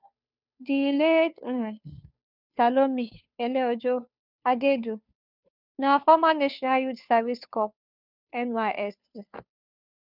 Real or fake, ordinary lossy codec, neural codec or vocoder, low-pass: fake; none; codec, 16 kHz, 2 kbps, FunCodec, trained on Chinese and English, 25 frames a second; 5.4 kHz